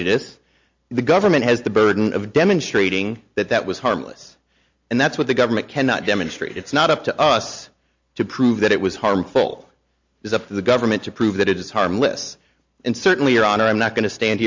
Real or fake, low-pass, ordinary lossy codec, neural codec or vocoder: real; 7.2 kHz; MP3, 48 kbps; none